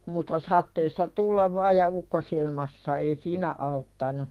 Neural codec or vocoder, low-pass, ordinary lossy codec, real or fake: codec, 32 kHz, 1.9 kbps, SNAC; 14.4 kHz; Opus, 32 kbps; fake